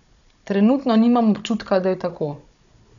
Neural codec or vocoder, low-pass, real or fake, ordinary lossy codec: codec, 16 kHz, 16 kbps, FunCodec, trained on Chinese and English, 50 frames a second; 7.2 kHz; fake; none